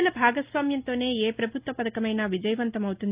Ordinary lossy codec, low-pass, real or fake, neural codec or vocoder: Opus, 24 kbps; 3.6 kHz; real; none